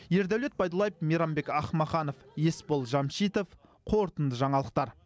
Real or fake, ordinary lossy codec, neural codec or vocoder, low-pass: real; none; none; none